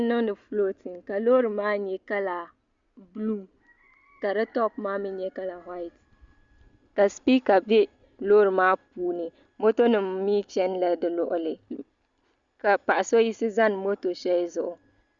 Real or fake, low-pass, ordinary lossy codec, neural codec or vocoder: real; 7.2 kHz; Opus, 64 kbps; none